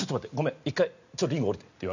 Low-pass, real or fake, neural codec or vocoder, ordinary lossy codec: 7.2 kHz; real; none; none